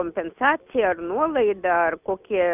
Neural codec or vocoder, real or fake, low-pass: none; real; 3.6 kHz